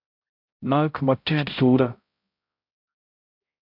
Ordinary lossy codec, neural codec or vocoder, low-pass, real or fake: MP3, 48 kbps; codec, 16 kHz, 0.5 kbps, X-Codec, HuBERT features, trained on balanced general audio; 5.4 kHz; fake